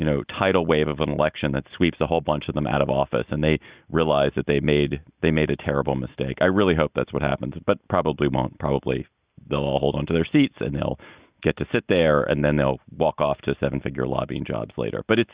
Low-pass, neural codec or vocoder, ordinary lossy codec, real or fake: 3.6 kHz; none; Opus, 64 kbps; real